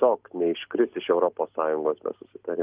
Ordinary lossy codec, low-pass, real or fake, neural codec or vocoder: Opus, 32 kbps; 3.6 kHz; real; none